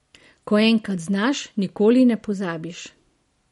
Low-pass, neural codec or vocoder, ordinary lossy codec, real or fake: 19.8 kHz; none; MP3, 48 kbps; real